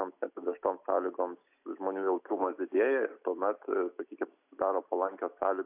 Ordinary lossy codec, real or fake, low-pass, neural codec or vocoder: AAC, 32 kbps; real; 3.6 kHz; none